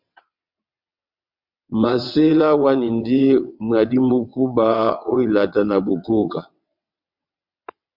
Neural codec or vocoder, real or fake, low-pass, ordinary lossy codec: vocoder, 22.05 kHz, 80 mel bands, WaveNeXt; fake; 5.4 kHz; MP3, 48 kbps